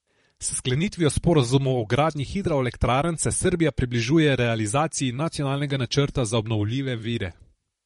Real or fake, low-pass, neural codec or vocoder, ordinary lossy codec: fake; 19.8 kHz; vocoder, 44.1 kHz, 128 mel bands, Pupu-Vocoder; MP3, 48 kbps